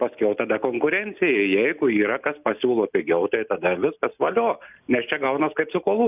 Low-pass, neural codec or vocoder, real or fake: 3.6 kHz; none; real